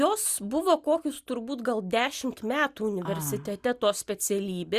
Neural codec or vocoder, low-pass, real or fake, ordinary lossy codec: none; 14.4 kHz; real; Opus, 64 kbps